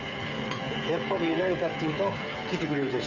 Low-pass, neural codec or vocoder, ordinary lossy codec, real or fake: 7.2 kHz; codec, 16 kHz, 8 kbps, FreqCodec, smaller model; none; fake